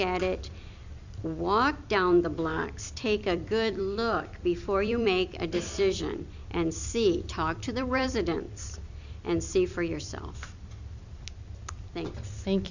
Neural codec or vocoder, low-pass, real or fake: vocoder, 44.1 kHz, 128 mel bands every 256 samples, BigVGAN v2; 7.2 kHz; fake